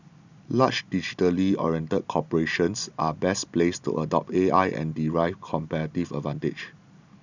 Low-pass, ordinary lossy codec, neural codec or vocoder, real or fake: 7.2 kHz; none; none; real